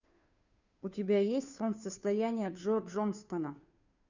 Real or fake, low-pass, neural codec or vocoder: fake; 7.2 kHz; codec, 16 kHz, 2 kbps, FunCodec, trained on Chinese and English, 25 frames a second